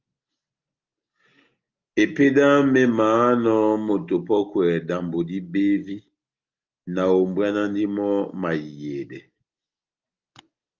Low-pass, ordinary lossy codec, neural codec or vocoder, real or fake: 7.2 kHz; Opus, 24 kbps; none; real